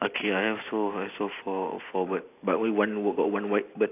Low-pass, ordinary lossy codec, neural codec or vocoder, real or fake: 3.6 kHz; AAC, 24 kbps; none; real